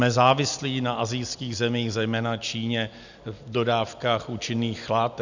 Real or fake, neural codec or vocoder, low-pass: real; none; 7.2 kHz